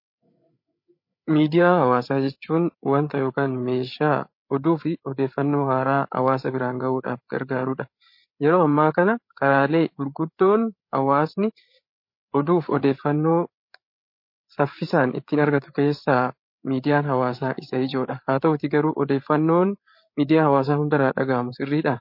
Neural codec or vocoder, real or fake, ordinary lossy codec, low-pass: codec, 16 kHz, 8 kbps, FreqCodec, larger model; fake; MP3, 32 kbps; 5.4 kHz